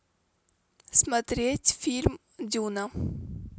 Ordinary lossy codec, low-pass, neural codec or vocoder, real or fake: none; none; none; real